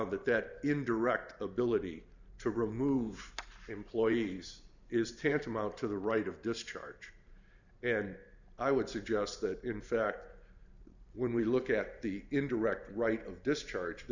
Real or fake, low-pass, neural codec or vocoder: fake; 7.2 kHz; vocoder, 44.1 kHz, 128 mel bands every 512 samples, BigVGAN v2